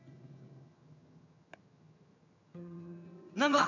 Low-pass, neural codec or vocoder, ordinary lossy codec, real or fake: 7.2 kHz; vocoder, 22.05 kHz, 80 mel bands, HiFi-GAN; none; fake